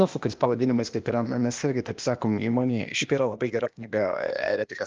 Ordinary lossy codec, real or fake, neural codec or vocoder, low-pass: Opus, 24 kbps; fake; codec, 16 kHz, 0.8 kbps, ZipCodec; 7.2 kHz